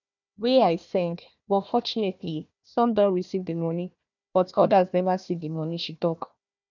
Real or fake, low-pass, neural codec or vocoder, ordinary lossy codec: fake; 7.2 kHz; codec, 16 kHz, 1 kbps, FunCodec, trained on Chinese and English, 50 frames a second; none